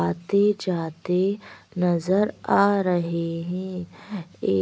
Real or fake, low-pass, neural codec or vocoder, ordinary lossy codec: real; none; none; none